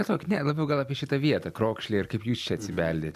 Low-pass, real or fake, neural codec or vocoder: 14.4 kHz; real; none